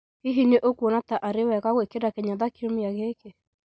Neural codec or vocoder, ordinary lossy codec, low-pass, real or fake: none; none; none; real